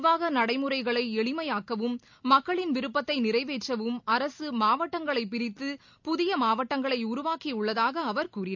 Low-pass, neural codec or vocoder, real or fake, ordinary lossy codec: 7.2 kHz; none; real; MP3, 64 kbps